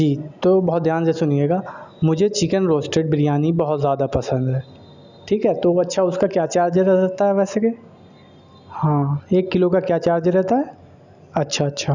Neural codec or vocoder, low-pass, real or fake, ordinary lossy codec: none; 7.2 kHz; real; none